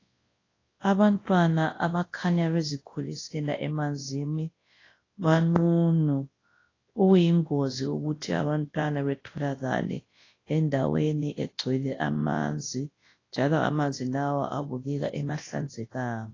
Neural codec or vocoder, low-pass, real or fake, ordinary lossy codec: codec, 24 kHz, 0.9 kbps, WavTokenizer, large speech release; 7.2 kHz; fake; AAC, 32 kbps